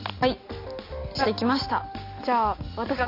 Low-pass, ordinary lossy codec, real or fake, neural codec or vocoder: 5.4 kHz; AAC, 32 kbps; real; none